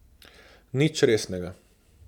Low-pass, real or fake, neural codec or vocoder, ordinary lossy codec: 19.8 kHz; real; none; none